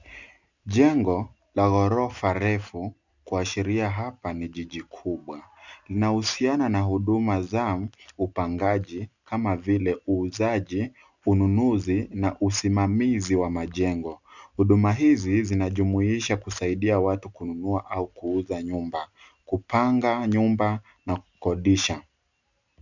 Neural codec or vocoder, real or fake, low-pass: none; real; 7.2 kHz